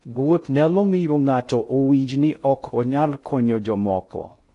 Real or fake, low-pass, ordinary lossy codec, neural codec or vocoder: fake; 10.8 kHz; AAC, 48 kbps; codec, 16 kHz in and 24 kHz out, 0.6 kbps, FocalCodec, streaming, 2048 codes